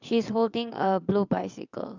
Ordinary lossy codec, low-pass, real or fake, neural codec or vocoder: none; 7.2 kHz; fake; vocoder, 22.05 kHz, 80 mel bands, WaveNeXt